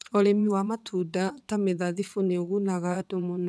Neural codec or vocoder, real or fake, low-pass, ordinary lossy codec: vocoder, 22.05 kHz, 80 mel bands, WaveNeXt; fake; none; none